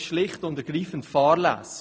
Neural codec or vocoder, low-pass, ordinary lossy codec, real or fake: none; none; none; real